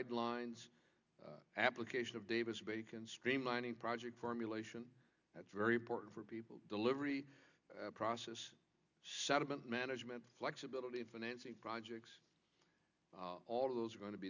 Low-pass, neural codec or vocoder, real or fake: 7.2 kHz; none; real